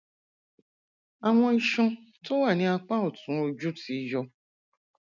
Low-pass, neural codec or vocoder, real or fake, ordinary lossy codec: 7.2 kHz; none; real; none